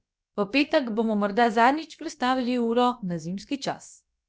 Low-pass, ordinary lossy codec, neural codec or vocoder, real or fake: none; none; codec, 16 kHz, about 1 kbps, DyCAST, with the encoder's durations; fake